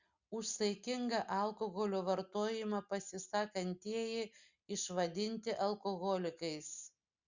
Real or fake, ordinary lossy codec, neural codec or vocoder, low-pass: real; Opus, 64 kbps; none; 7.2 kHz